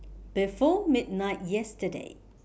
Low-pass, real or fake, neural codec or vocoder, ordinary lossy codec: none; real; none; none